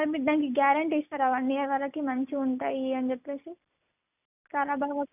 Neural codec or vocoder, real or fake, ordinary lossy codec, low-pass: none; real; none; 3.6 kHz